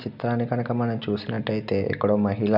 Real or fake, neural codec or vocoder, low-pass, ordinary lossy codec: real; none; 5.4 kHz; none